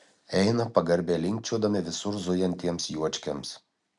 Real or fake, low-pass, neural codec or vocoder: real; 10.8 kHz; none